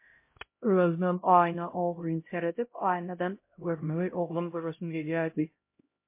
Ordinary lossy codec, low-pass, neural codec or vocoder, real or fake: MP3, 24 kbps; 3.6 kHz; codec, 16 kHz, 0.5 kbps, X-Codec, HuBERT features, trained on LibriSpeech; fake